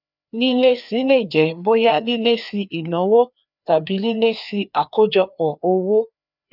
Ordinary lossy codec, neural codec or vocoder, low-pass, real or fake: none; codec, 16 kHz, 2 kbps, FreqCodec, larger model; 5.4 kHz; fake